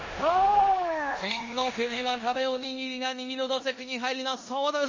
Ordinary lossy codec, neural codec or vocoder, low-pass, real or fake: MP3, 32 kbps; codec, 16 kHz in and 24 kHz out, 0.9 kbps, LongCat-Audio-Codec, four codebook decoder; 7.2 kHz; fake